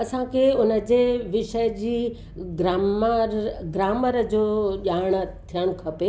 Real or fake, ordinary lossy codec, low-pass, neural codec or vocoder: real; none; none; none